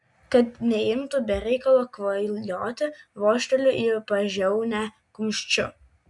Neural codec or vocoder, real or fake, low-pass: none; real; 10.8 kHz